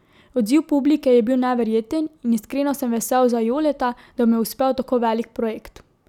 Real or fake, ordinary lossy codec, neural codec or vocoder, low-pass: real; none; none; 19.8 kHz